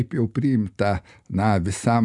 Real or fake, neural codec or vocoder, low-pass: real; none; 10.8 kHz